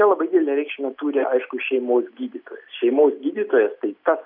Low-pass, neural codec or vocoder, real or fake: 5.4 kHz; none; real